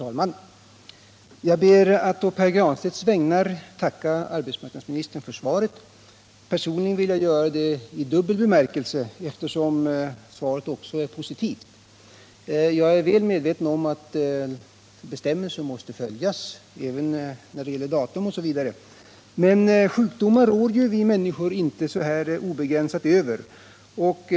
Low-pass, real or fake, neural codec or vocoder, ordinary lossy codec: none; real; none; none